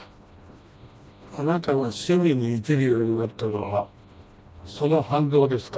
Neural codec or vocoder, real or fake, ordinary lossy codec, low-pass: codec, 16 kHz, 1 kbps, FreqCodec, smaller model; fake; none; none